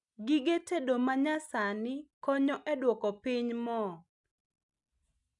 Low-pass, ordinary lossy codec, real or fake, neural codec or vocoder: 10.8 kHz; none; real; none